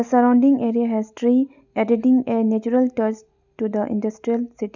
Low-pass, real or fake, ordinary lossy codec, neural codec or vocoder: 7.2 kHz; real; none; none